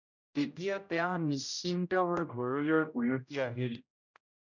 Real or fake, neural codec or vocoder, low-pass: fake; codec, 16 kHz, 0.5 kbps, X-Codec, HuBERT features, trained on general audio; 7.2 kHz